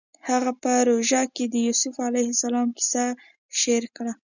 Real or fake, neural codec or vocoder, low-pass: real; none; 7.2 kHz